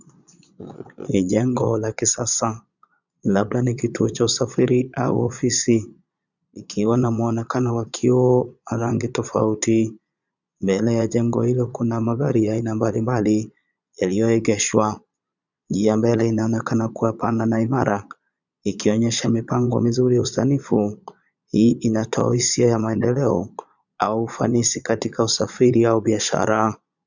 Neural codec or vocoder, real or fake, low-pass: vocoder, 22.05 kHz, 80 mel bands, Vocos; fake; 7.2 kHz